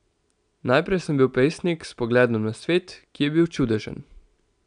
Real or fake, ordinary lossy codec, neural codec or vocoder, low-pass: real; none; none; 9.9 kHz